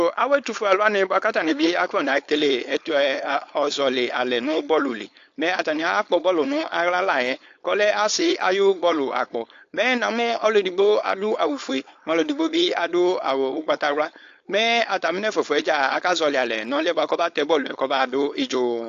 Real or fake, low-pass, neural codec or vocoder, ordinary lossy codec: fake; 7.2 kHz; codec, 16 kHz, 4.8 kbps, FACodec; AAC, 48 kbps